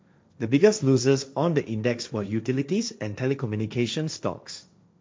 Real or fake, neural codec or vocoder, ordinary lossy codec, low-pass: fake; codec, 16 kHz, 1.1 kbps, Voila-Tokenizer; none; none